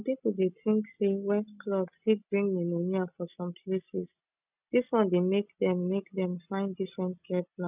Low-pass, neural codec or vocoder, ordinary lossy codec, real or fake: 3.6 kHz; none; none; real